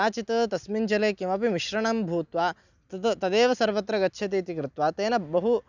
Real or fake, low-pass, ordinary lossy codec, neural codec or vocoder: real; 7.2 kHz; none; none